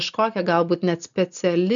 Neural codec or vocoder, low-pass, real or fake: none; 7.2 kHz; real